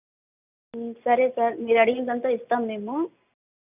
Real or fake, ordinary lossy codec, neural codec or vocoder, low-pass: real; none; none; 3.6 kHz